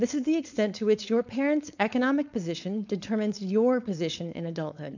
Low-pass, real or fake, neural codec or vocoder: 7.2 kHz; fake; codec, 16 kHz, 4.8 kbps, FACodec